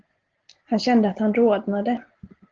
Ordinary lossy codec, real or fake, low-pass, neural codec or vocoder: Opus, 16 kbps; real; 7.2 kHz; none